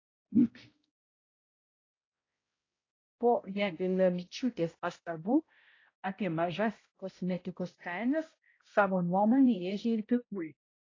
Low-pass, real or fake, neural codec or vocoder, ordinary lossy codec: 7.2 kHz; fake; codec, 16 kHz, 0.5 kbps, X-Codec, HuBERT features, trained on balanced general audio; AAC, 32 kbps